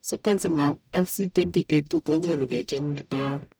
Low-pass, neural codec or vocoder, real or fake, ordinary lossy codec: none; codec, 44.1 kHz, 0.9 kbps, DAC; fake; none